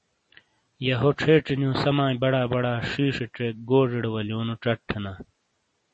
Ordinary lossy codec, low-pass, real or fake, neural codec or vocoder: MP3, 32 kbps; 10.8 kHz; real; none